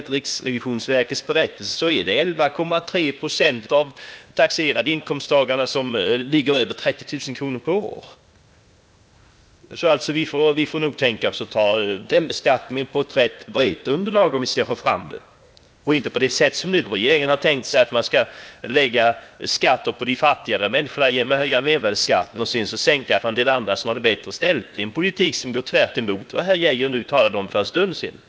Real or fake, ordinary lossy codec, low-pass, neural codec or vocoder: fake; none; none; codec, 16 kHz, 0.8 kbps, ZipCodec